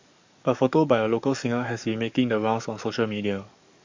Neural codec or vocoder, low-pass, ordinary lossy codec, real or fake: codec, 44.1 kHz, 7.8 kbps, DAC; 7.2 kHz; MP3, 48 kbps; fake